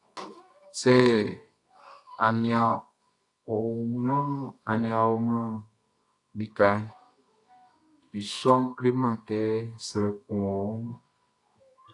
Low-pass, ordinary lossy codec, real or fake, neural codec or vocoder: 10.8 kHz; AAC, 48 kbps; fake; codec, 24 kHz, 0.9 kbps, WavTokenizer, medium music audio release